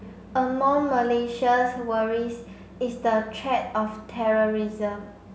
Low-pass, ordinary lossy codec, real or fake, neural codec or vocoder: none; none; real; none